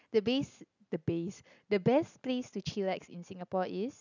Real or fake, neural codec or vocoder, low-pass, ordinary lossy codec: real; none; 7.2 kHz; none